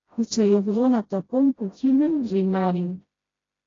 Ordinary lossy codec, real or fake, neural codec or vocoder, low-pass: AAC, 32 kbps; fake; codec, 16 kHz, 0.5 kbps, FreqCodec, smaller model; 7.2 kHz